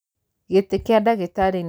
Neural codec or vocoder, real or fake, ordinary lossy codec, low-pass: none; real; none; none